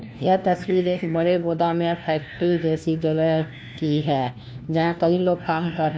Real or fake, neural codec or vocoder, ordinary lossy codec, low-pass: fake; codec, 16 kHz, 1 kbps, FunCodec, trained on LibriTTS, 50 frames a second; none; none